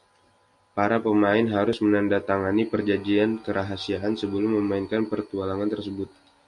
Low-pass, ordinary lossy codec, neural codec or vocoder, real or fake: 10.8 kHz; MP3, 64 kbps; none; real